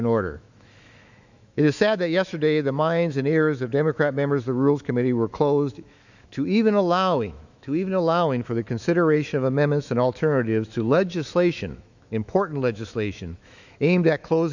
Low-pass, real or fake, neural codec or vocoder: 7.2 kHz; fake; autoencoder, 48 kHz, 128 numbers a frame, DAC-VAE, trained on Japanese speech